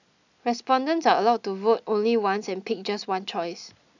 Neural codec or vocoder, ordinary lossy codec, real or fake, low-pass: none; none; real; 7.2 kHz